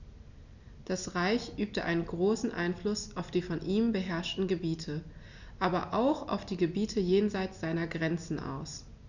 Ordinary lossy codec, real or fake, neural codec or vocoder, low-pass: none; real; none; 7.2 kHz